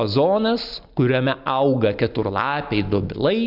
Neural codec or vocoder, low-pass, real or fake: none; 5.4 kHz; real